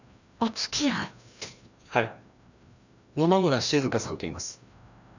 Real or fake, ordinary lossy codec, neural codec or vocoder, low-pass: fake; none; codec, 16 kHz, 1 kbps, FreqCodec, larger model; 7.2 kHz